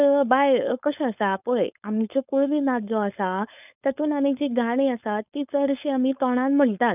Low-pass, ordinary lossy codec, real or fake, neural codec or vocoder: 3.6 kHz; none; fake; codec, 16 kHz, 4.8 kbps, FACodec